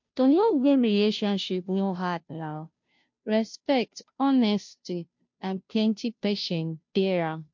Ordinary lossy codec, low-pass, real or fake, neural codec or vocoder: MP3, 48 kbps; 7.2 kHz; fake; codec, 16 kHz, 0.5 kbps, FunCodec, trained on Chinese and English, 25 frames a second